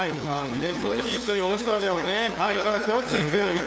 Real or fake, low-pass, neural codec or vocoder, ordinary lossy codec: fake; none; codec, 16 kHz, 2 kbps, FunCodec, trained on LibriTTS, 25 frames a second; none